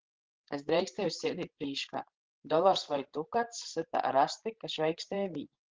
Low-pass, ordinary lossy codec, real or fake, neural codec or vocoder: 7.2 kHz; Opus, 16 kbps; fake; codec, 16 kHz, 16 kbps, FreqCodec, larger model